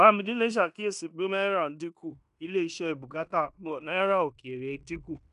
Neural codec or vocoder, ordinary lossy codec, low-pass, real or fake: codec, 16 kHz in and 24 kHz out, 0.9 kbps, LongCat-Audio-Codec, fine tuned four codebook decoder; none; 10.8 kHz; fake